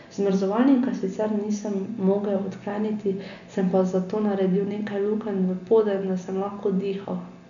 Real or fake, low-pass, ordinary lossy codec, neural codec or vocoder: real; 7.2 kHz; none; none